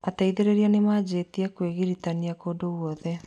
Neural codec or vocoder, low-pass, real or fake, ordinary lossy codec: none; none; real; none